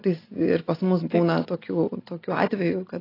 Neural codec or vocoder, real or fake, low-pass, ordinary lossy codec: none; real; 5.4 kHz; AAC, 24 kbps